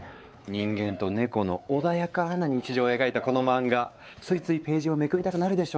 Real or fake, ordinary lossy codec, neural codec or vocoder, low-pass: fake; none; codec, 16 kHz, 4 kbps, X-Codec, WavLM features, trained on Multilingual LibriSpeech; none